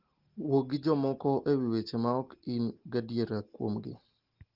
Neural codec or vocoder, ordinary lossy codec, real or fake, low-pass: none; Opus, 16 kbps; real; 5.4 kHz